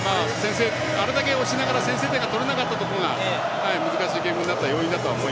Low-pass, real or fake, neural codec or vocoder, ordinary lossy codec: none; real; none; none